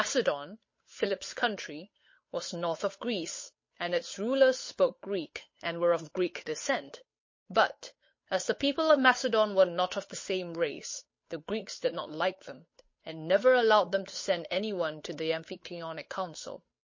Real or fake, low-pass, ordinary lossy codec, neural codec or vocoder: fake; 7.2 kHz; MP3, 32 kbps; codec, 16 kHz, 8 kbps, FunCodec, trained on Chinese and English, 25 frames a second